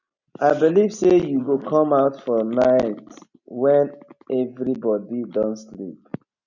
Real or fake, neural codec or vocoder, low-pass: real; none; 7.2 kHz